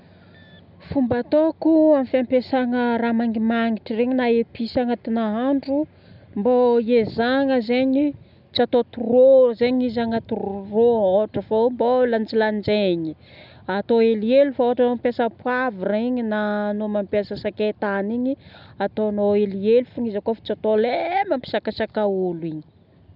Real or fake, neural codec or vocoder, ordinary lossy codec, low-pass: real; none; none; 5.4 kHz